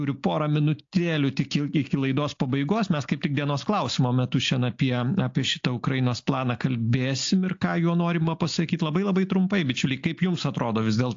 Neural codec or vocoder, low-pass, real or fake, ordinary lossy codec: none; 7.2 kHz; real; AAC, 48 kbps